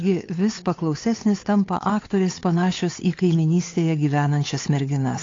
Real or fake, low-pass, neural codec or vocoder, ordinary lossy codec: fake; 7.2 kHz; codec, 16 kHz, 8 kbps, FunCodec, trained on Chinese and English, 25 frames a second; AAC, 32 kbps